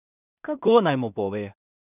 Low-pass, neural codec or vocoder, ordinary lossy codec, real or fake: 3.6 kHz; codec, 16 kHz in and 24 kHz out, 0.4 kbps, LongCat-Audio-Codec, two codebook decoder; none; fake